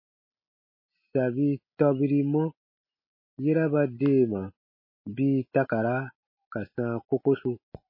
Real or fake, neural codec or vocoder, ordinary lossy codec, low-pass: real; none; MP3, 24 kbps; 5.4 kHz